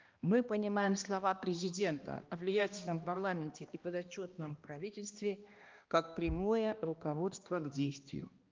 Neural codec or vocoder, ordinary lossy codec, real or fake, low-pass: codec, 16 kHz, 1 kbps, X-Codec, HuBERT features, trained on balanced general audio; Opus, 24 kbps; fake; 7.2 kHz